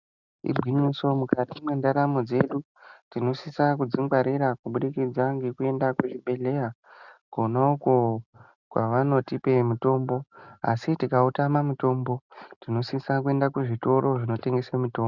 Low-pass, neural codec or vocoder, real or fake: 7.2 kHz; none; real